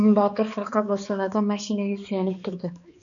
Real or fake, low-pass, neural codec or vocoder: fake; 7.2 kHz; codec, 16 kHz, 2 kbps, X-Codec, HuBERT features, trained on balanced general audio